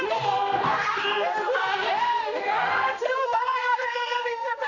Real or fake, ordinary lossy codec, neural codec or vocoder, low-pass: fake; none; codec, 16 kHz, 1 kbps, X-Codec, HuBERT features, trained on general audio; 7.2 kHz